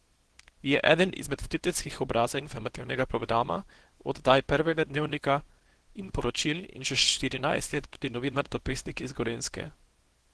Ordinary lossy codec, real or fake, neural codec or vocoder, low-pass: Opus, 16 kbps; fake; codec, 24 kHz, 0.9 kbps, WavTokenizer, small release; 10.8 kHz